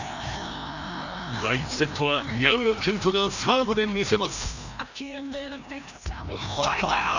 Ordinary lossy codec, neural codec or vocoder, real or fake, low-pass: none; codec, 16 kHz, 1 kbps, FreqCodec, larger model; fake; 7.2 kHz